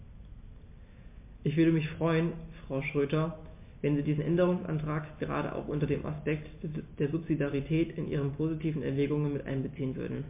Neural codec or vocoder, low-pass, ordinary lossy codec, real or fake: none; 3.6 kHz; MP3, 24 kbps; real